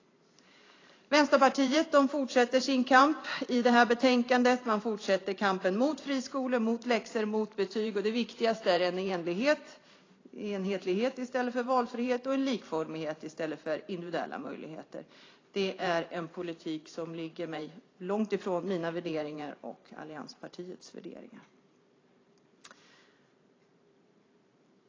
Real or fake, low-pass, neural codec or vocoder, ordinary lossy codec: fake; 7.2 kHz; vocoder, 44.1 kHz, 128 mel bands every 512 samples, BigVGAN v2; AAC, 32 kbps